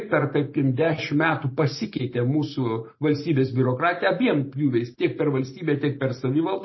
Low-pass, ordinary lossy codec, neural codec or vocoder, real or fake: 7.2 kHz; MP3, 24 kbps; none; real